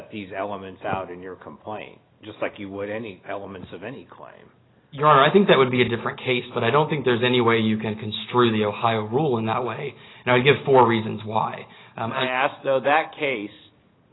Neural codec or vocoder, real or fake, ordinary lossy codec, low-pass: none; real; AAC, 16 kbps; 7.2 kHz